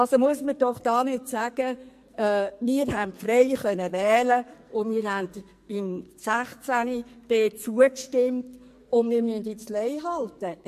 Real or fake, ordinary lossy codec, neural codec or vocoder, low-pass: fake; MP3, 64 kbps; codec, 44.1 kHz, 2.6 kbps, SNAC; 14.4 kHz